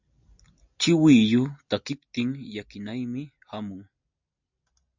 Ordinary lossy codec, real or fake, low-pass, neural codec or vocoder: MP3, 64 kbps; real; 7.2 kHz; none